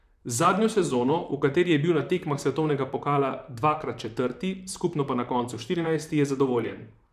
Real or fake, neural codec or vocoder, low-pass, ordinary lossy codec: fake; vocoder, 44.1 kHz, 128 mel bands, Pupu-Vocoder; 14.4 kHz; none